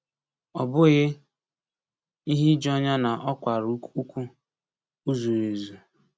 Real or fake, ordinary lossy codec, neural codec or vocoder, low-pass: real; none; none; none